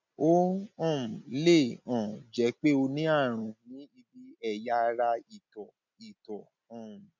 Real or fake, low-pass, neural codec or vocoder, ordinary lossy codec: real; 7.2 kHz; none; none